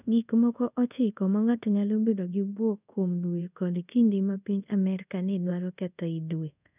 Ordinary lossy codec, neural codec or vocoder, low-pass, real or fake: none; codec, 24 kHz, 0.5 kbps, DualCodec; 3.6 kHz; fake